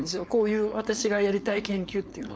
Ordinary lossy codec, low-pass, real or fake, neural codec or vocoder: none; none; fake; codec, 16 kHz, 4.8 kbps, FACodec